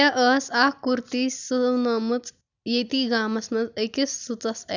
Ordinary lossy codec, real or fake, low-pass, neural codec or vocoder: none; real; 7.2 kHz; none